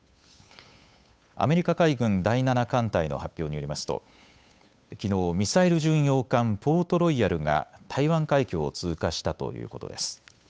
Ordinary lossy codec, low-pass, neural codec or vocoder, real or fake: none; none; codec, 16 kHz, 8 kbps, FunCodec, trained on Chinese and English, 25 frames a second; fake